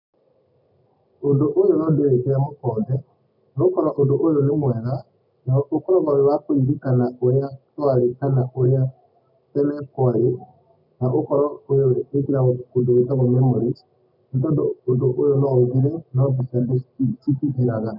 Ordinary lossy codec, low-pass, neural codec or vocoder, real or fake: none; 5.4 kHz; none; real